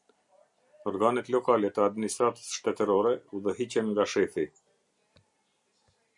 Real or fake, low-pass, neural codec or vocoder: real; 10.8 kHz; none